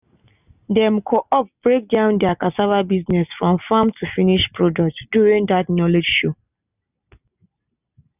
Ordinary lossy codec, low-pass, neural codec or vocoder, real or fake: none; 3.6 kHz; none; real